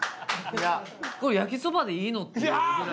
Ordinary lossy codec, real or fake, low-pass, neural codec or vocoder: none; real; none; none